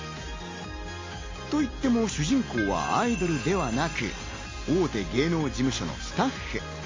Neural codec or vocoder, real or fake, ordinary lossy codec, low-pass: none; real; MP3, 32 kbps; 7.2 kHz